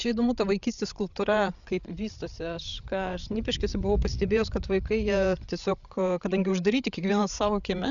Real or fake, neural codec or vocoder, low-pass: fake; codec, 16 kHz, 8 kbps, FreqCodec, larger model; 7.2 kHz